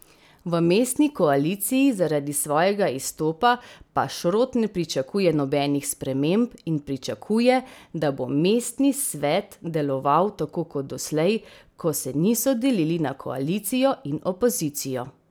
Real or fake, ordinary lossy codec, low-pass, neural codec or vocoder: real; none; none; none